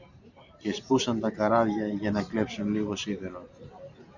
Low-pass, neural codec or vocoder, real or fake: 7.2 kHz; vocoder, 44.1 kHz, 128 mel bands every 512 samples, BigVGAN v2; fake